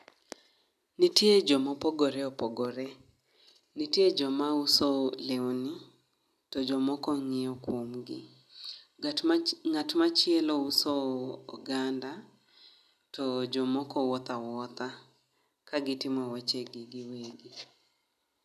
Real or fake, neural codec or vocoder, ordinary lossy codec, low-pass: real; none; none; 14.4 kHz